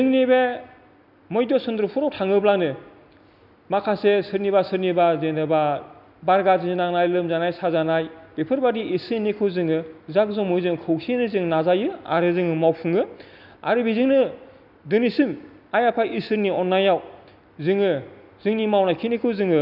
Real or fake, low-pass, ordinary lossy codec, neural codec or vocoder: real; 5.4 kHz; none; none